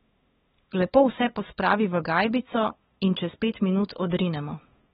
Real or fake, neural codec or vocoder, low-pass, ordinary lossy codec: fake; codec, 16 kHz, 8 kbps, FunCodec, trained on LibriTTS, 25 frames a second; 7.2 kHz; AAC, 16 kbps